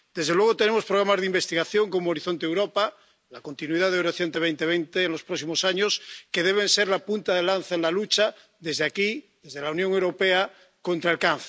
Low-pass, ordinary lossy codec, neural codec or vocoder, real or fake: none; none; none; real